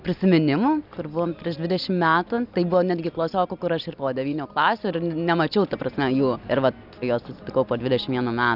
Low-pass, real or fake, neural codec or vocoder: 5.4 kHz; real; none